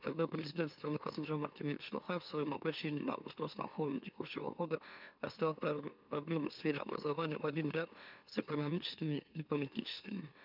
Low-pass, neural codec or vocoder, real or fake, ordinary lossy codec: 5.4 kHz; autoencoder, 44.1 kHz, a latent of 192 numbers a frame, MeloTTS; fake; none